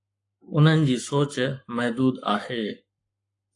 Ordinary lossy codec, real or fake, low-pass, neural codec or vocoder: AAC, 48 kbps; fake; 10.8 kHz; codec, 44.1 kHz, 7.8 kbps, Pupu-Codec